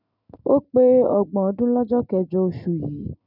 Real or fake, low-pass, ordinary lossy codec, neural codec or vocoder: real; 5.4 kHz; none; none